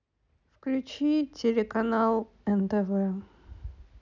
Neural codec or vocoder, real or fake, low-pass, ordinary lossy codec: none; real; 7.2 kHz; none